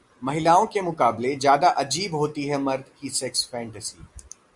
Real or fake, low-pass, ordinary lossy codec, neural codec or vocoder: real; 10.8 kHz; Opus, 64 kbps; none